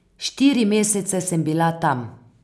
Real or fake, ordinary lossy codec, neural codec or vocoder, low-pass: real; none; none; none